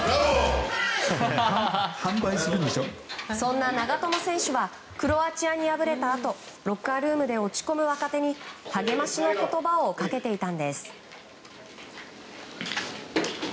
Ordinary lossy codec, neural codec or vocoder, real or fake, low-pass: none; none; real; none